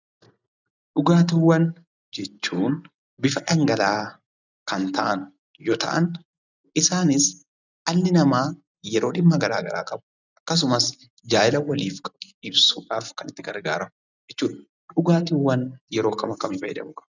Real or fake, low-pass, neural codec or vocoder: real; 7.2 kHz; none